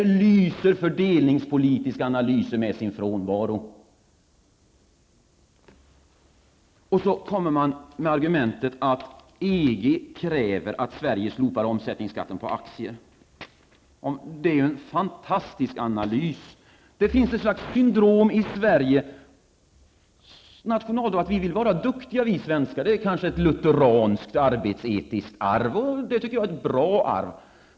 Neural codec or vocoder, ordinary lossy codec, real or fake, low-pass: none; none; real; none